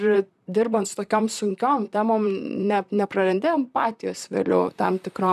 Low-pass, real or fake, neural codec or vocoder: 14.4 kHz; fake; vocoder, 44.1 kHz, 128 mel bands, Pupu-Vocoder